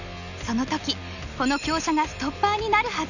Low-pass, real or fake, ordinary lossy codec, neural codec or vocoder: 7.2 kHz; real; none; none